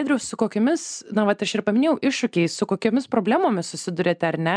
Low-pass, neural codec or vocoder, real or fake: 9.9 kHz; none; real